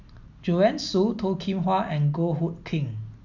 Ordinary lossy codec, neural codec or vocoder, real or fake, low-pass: none; none; real; 7.2 kHz